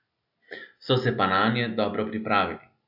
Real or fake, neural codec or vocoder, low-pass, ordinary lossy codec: real; none; 5.4 kHz; AAC, 48 kbps